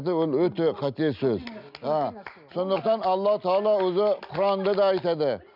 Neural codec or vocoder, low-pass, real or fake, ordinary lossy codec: none; 5.4 kHz; real; none